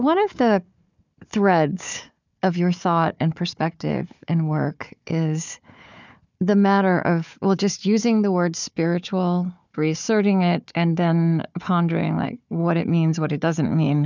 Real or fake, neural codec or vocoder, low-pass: fake; codec, 16 kHz, 4 kbps, FunCodec, trained on Chinese and English, 50 frames a second; 7.2 kHz